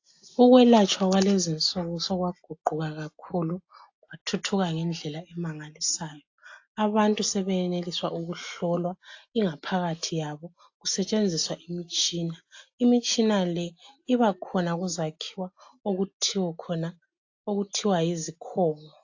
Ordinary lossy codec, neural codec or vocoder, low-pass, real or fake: AAC, 48 kbps; none; 7.2 kHz; real